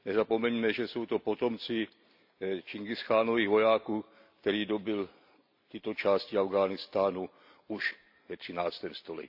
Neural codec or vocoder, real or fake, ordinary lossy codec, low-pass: none; real; AAC, 48 kbps; 5.4 kHz